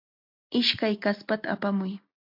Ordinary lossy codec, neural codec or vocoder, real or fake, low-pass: MP3, 48 kbps; none; real; 5.4 kHz